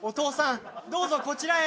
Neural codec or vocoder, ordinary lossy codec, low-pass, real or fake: none; none; none; real